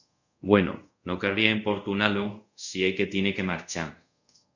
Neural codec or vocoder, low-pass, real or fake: codec, 24 kHz, 0.5 kbps, DualCodec; 7.2 kHz; fake